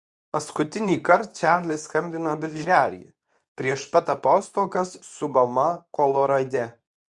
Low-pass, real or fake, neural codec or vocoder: 10.8 kHz; fake; codec, 24 kHz, 0.9 kbps, WavTokenizer, medium speech release version 2